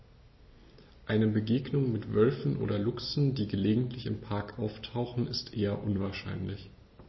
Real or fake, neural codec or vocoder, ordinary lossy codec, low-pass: real; none; MP3, 24 kbps; 7.2 kHz